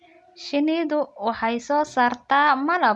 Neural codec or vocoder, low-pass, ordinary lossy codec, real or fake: none; none; none; real